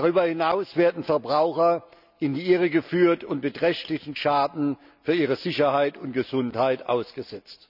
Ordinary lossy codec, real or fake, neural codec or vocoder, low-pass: none; real; none; 5.4 kHz